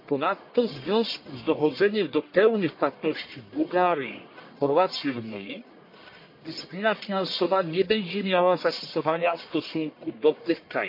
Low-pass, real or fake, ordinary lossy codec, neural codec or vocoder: 5.4 kHz; fake; MP3, 32 kbps; codec, 44.1 kHz, 1.7 kbps, Pupu-Codec